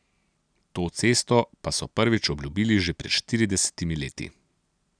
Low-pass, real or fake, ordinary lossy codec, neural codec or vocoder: 9.9 kHz; real; none; none